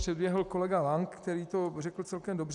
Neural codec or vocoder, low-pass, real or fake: none; 10.8 kHz; real